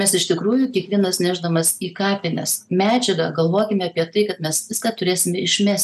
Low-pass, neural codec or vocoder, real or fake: 14.4 kHz; none; real